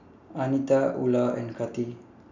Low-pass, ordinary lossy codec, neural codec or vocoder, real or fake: 7.2 kHz; none; none; real